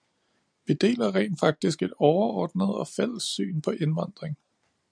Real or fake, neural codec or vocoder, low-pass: fake; vocoder, 44.1 kHz, 128 mel bands every 512 samples, BigVGAN v2; 9.9 kHz